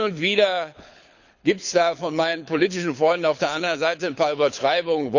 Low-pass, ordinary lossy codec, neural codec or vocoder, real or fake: 7.2 kHz; none; codec, 24 kHz, 6 kbps, HILCodec; fake